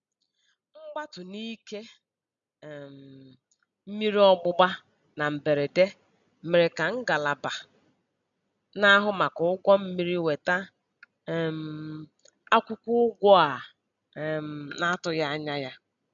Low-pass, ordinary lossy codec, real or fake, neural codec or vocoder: 7.2 kHz; none; real; none